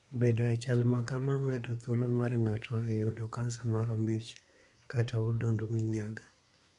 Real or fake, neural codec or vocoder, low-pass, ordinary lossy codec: fake; codec, 24 kHz, 1 kbps, SNAC; 10.8 kHz; none